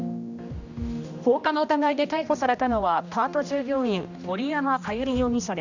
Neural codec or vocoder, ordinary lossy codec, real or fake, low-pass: codec, 16 kHz, 1 kbps, X-Codec, HuBERT features, trained on general audio; none; fake; 7.2 kHz